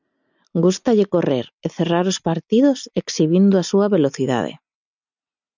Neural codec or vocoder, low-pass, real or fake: none; 7.2 kHz; real